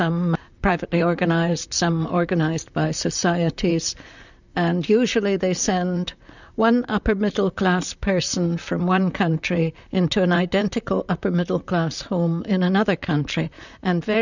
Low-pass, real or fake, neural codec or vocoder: 7.2 kHz; fake; vocoder, 44.1 kHz, 128 mel bands, Pupu-Vocoder